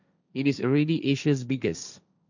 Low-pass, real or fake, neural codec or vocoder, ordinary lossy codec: 7.2 kHz; fake; codec, 16 kHz, 1.1 kbps, Voila-Tokenizer; none